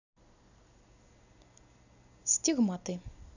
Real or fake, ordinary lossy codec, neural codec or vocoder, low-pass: real; none; none; 7.2 kHz